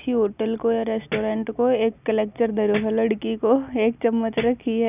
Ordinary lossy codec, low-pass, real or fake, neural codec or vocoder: none; 3.6 kHz; real; none